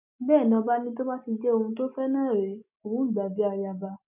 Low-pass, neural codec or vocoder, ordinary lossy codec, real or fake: 3.6 kHz; none; none; real